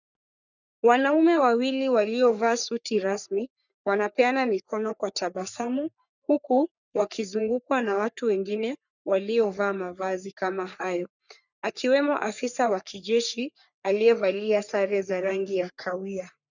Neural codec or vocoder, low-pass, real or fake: codec, 44.1 kHz, 3.4 kbps, Pupu-Codec; 7.2 kHz; fake